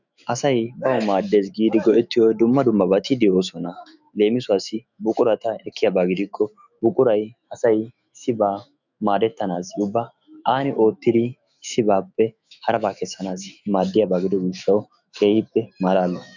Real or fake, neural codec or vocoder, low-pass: fake; autoencoder, 48 kHz, 128 numbers a frame, DAC-VAE, trained on Japanese speech; 7.2 kHz